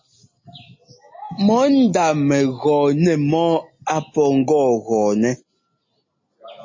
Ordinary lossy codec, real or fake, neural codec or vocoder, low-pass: MP3, 32 kbps; real; none; 7.2 kHz